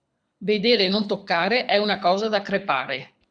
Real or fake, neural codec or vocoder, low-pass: fake; codec, 24 kHz, 6 kbps, HILCodec; 9.9 kHz